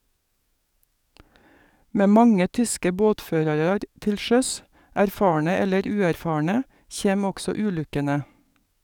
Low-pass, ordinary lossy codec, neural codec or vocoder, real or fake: 19.8 kHz; none; codec, 44.1 kHz, 7.8 kbps, DAC; fake